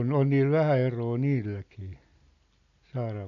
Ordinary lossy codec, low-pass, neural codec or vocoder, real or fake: none; 7.2 kHz; none; real